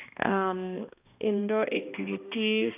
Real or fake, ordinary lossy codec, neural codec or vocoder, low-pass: fake; none; codec, 16 kHz, 1 kbps, X-Codec, HuBERT features, trained on balanced general audio; 3.6 kHz